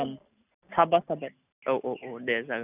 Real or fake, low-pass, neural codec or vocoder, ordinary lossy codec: real; 3.6 kHz; none; none